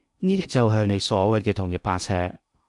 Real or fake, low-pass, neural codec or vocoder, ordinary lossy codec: fake; 10.8 kHz; codec, 16 kHz in and 24 kHz out, 0.8 kbps, FocalCodec, streaming, 65536 codes; MP3, 96 kbps